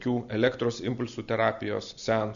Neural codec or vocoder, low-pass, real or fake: none; 7.2 kHz; real